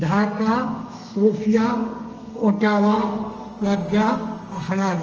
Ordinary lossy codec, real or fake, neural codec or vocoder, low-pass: Opus, 24 kbps; fake; codec, 32 kHz, 1.9 kbps, SNAC; 7.2 kHz